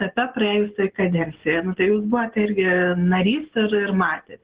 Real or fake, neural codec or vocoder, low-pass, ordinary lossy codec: real; none; 3.6 kHz; Opus, 16 kbps